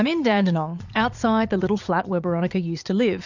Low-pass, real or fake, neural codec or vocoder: 7.2 kHz; fake; vocoder, 22.05 kHz, 80 mel bands, Vocos